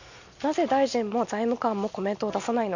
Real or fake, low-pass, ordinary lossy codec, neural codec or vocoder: real; 7.2 kHz; none; none